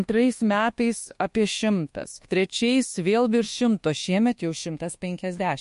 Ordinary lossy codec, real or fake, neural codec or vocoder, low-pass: MP3, 48 kbps; fake; codec, 24 kHz, 1.2 kbps, DualCodec; 10.8 kHz